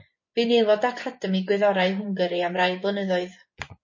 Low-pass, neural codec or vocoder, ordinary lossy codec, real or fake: 7.2 kHz; vocoder, 24 kHz, 100 mel bands, Vocos; MP3, 32 kbps; fake